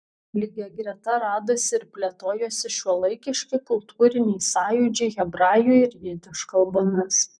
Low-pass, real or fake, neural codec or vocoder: 9.9 kHz; fake; vocoder, 44.1 kHz, 128 mel bands, Pupu-Vocoder